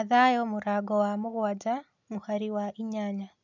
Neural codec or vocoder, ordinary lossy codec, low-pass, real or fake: none; none; 7.2 kHz; real